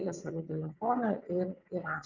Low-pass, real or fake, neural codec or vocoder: 7.2 kHz; fake; vocoder, 22.05 kHz, 80 mel bands, WaveNeXt